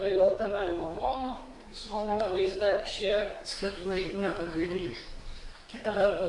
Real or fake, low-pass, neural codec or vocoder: fake; 10.8 kHz; codec, 24 kHz, 1 kbps, SNAC